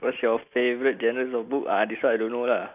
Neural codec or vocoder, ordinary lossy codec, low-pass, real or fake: none; none; 3.6 kHz; real